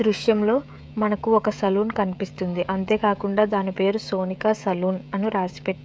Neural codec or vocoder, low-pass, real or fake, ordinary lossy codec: codec, 16 kHz, 16 kbps, FreqCodec, smaller model; none; fake; none